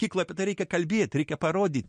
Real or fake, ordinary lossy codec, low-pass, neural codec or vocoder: real; MP3, 48 kbps; 9.9 kHz; none